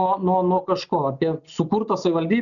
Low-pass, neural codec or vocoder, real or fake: 7.2 kHz; none; real